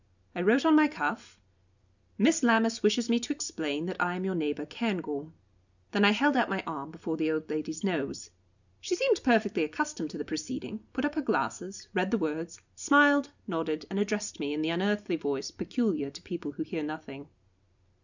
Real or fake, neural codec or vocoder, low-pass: real; none; 7.2 kHz